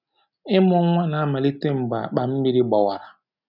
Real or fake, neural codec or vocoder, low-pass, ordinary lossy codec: real; none; 5.4 kHz; none